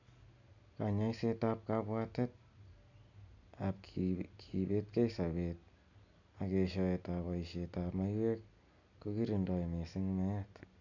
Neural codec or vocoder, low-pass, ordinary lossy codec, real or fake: none; 7.2 kHz; none; real